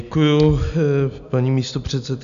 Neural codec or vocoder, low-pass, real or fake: none; 7.2 kHz; real